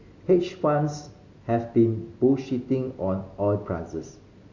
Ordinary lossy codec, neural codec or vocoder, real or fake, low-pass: Opus, 64 kbps; none; real; 7.2 kHz